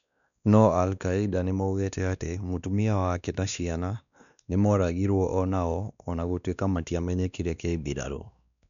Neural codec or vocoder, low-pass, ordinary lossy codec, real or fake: codec, 16 kHz, 2 kbps, X-Codec, WavLM features, trained on Multilingual LibriSpeech; 7.2 kHz; none; fake